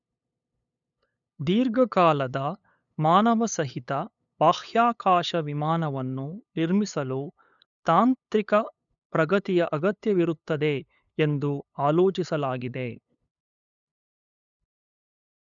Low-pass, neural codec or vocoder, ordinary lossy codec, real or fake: 7.2 kHz; codec, 16 kHz, 8 kbps, FunCodec, trained on LibriTTS, 25 frames a second; none; fake